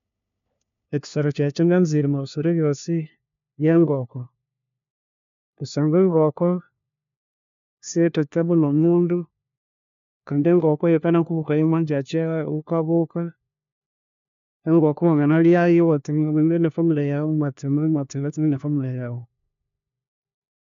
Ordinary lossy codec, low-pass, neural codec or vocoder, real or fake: MP3, 64 kbps; 7.2 kHz; codec, 16 kHz, 1 kbps, FunCodec, trained on LibriTTS, 50 frames a second; fake